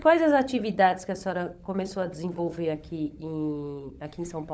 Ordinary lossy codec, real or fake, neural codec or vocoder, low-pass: none; fake; codec, 16 kHz, 16 kbps, FunCodec, trained on LibriTTS, 50 frames a second; none